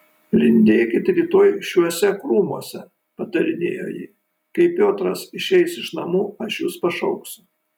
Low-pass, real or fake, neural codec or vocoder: 19.8 kHz; real; none